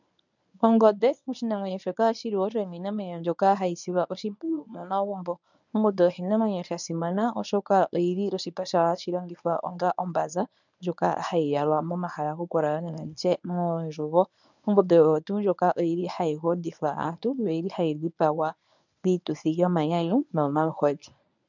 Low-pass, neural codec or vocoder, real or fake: 7.2 kHz; codec, 24 kHz, 0.9 kbps, WavTokenizer, medium speech release version 1; fake